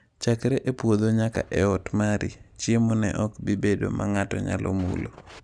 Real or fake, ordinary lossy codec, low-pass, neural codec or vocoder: real; none; 9.9 kHz; none